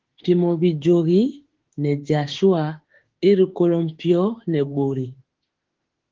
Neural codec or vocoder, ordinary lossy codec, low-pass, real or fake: autoencoder, 48 kHz, 32 numbers a frame, DAC-VAE, trained on Japanese speech; Opus, 16 kbps; 7.2 kHz; fake